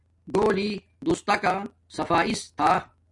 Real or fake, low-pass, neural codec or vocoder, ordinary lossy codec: real; 10.8 kHz; none; AAC, 64 kbps